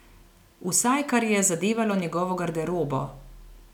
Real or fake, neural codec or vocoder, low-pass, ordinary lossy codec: real; none; 19.8 kHz; none